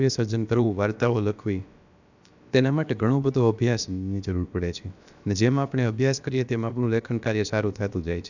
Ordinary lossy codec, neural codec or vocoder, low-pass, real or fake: none; codec, 16 kHz, about 1 kbps, DyCAST, with the encoder's durations; 7.2 kHz; fake